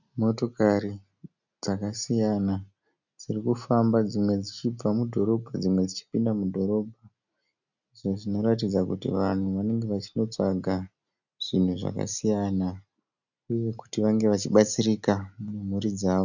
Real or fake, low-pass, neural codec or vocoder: real; 7.2 kHz; none